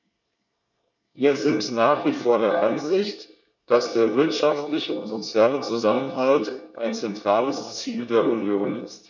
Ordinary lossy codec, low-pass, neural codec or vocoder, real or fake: none; 7.2 kHz; codec, 24 kHz, 1 kbps, SNAC; fake